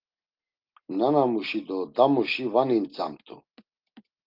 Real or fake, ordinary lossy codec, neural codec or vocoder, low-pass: real; Opus, 32 kbps; none; 5.4 kHz